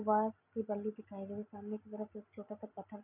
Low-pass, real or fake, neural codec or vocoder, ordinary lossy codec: 3.6 kHz; real; none; none